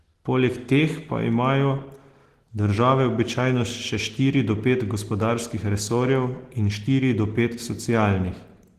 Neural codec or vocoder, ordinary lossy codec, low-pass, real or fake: none; Opus, 16 kbps; 14.4 kHz; real